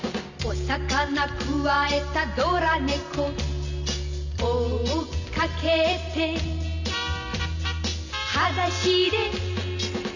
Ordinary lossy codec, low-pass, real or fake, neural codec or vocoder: none; 7.2 kHz; real; none